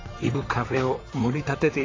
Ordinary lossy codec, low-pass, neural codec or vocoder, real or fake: AAC, 32 kbps; 7.2 kHz; vocoder, 44.1 kHz, 128 mel bands, Pupu-Vocoder; fake